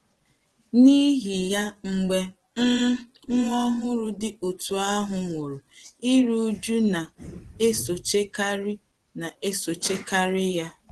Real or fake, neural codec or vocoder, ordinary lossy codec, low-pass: fake; vocoder, 24 kHz, 100 mel bands, Vocos; Opus, 16 kbps; 10.8 kHz